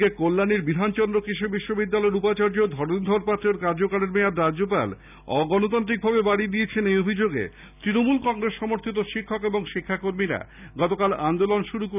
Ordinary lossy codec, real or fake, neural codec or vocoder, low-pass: none; real; none; 3.6 kHz